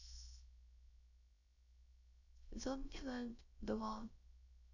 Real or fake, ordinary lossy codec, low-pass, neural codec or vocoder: fake; none; 7.2 kHz; codec, 16 kHz, 0.3 kbps, FocalCodec